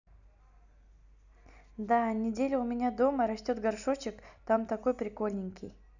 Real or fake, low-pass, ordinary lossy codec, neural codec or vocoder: real; 7.2 kHz; none; none